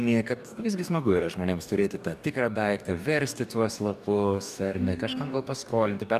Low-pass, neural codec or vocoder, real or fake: 14.4 kHz; codec, 44.1 kHz, 2.6 kbps, DAC; fake